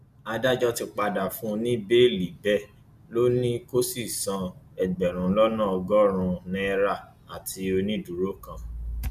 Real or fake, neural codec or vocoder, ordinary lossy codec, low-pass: real; none; none; 14.4 kHz